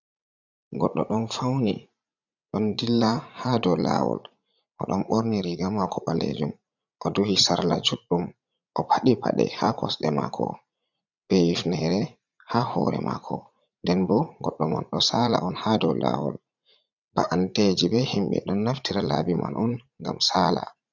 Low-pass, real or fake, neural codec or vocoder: 7.2 kHz; fake; vocoder, 44.1 kHz, 80 mel bands, Vocos